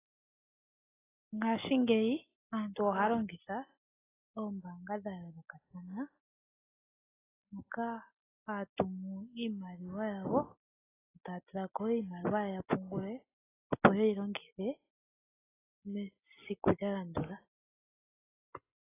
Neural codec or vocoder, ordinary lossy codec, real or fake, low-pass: none; AAC, 16 kbps; real; 3.6 kHz